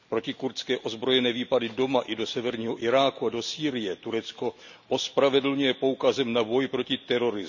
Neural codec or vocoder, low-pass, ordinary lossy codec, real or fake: none; 7.2 kHz; none; real